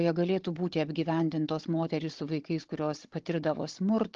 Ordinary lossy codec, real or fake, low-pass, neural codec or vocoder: Opus, 16 kbps; fake; 7.2 kHz; codec, 16 kHz, 16 kbps, FunCodec, trained on Chinese and English, 50 frames a second